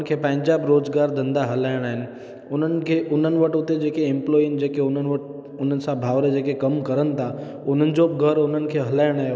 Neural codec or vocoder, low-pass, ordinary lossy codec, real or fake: none; none; none; real